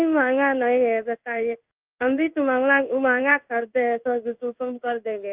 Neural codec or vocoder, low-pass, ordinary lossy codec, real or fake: codec, 16 kHz in and 24 kHz out, 1 kbps, XY-Tokenizer; 3.6 kHz; Opus, 24 kbps; fake